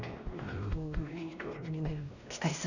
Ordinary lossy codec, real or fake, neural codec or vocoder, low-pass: none; fake; codec, 16 kHz, 1 kbps, X-Codec, WavLM features, trained on Multilingual LibriSpeech; 7.2 kHz